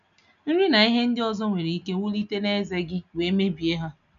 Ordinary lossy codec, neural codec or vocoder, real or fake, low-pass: none; none; real; 7.2 kHz